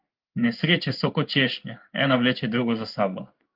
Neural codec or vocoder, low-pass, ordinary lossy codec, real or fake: codec, 16 kHz in and 24 kHz out, 1 kbps, XY-Tokenizer; 5.4 kHz; Opus, 24 kbps; fake